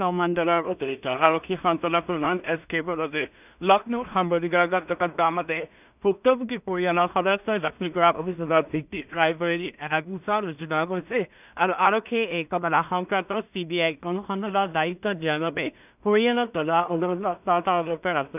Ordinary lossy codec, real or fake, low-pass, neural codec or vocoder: none; fake; 3.6 kHz; codec, 16 kHz in and 24 kHz out, 0.4 kbps, LongCat-Audio-Codec, two codebook decoder